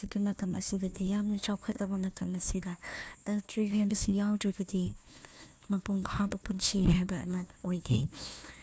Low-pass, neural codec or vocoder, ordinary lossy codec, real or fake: none; codec, 16 kHz, 1 kbps, FunCodec, trained on Chinese and English, 50 frames a second; none; fake